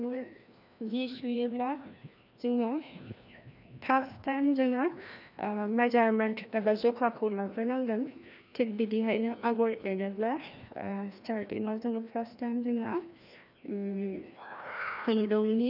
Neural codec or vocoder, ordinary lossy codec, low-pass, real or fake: codec, 16 kHz, 1 kbps, FreqCodec, larger model; none; 5.4 kHz; fake